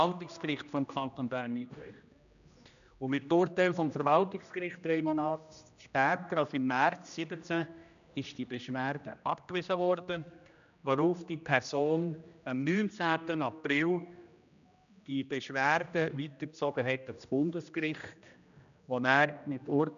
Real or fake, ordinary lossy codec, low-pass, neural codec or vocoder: fake; none; 7.2 kHz; codec, 16 kHz, 1 kbps, X-Codec, HuBERT features, trained on general audio